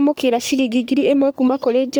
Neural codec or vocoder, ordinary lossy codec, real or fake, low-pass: codec, 44.1 kHz, 3.4 kbps, Pupu-Codec; none; fake; none